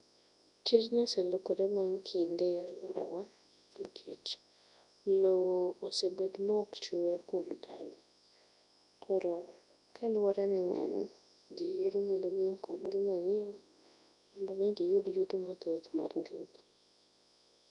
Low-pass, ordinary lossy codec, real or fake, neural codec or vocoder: 10.8 kHz; none; fake; codec, 24 kHz, 0.9 kbps, WavTokenizer, large speech release